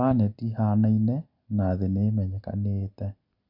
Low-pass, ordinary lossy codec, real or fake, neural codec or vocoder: 5.4 kHz; MP3, 48 kbps; real; none